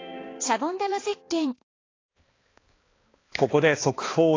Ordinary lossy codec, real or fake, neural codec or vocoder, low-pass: AAC, 32 kbps; fake; codec, 16 kHz, 2 kbps, X-Codec, HuBERT features, trained on balanced general audio; 7.2 kHz